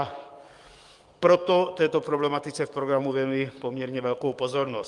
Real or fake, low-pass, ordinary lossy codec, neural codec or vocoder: fake; 10.8 kHz; Opus, 32 kbps; codec, 44.1 kHz, 7.8 kbps, DAC